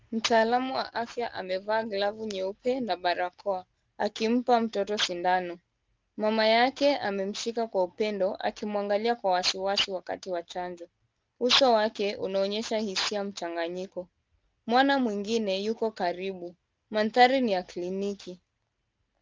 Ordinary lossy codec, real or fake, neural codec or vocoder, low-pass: Opus, 32 kbps; real; none; 7.2 kHz